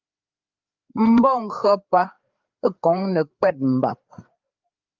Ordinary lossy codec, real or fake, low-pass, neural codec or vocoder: Opus, 32 kbps; fake; 7.2 kHz; codec, 16 kHz, 4 kbps, FreqCodec, larger model